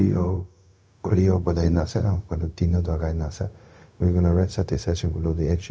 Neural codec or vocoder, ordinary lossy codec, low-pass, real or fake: codec, 16 kHz, 0.4 kbps, LongCat-Audio-Codec; none; none; fake